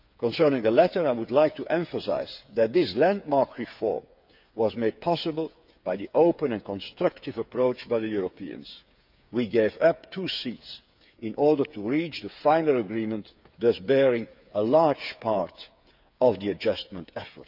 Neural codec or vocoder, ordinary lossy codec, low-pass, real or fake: codec, 16 kHz, 8 kbps, FreqCodec, smaller model; none; 5.4 kHz; fake